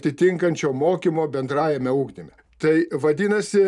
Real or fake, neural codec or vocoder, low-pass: real; none; 10.8 kHz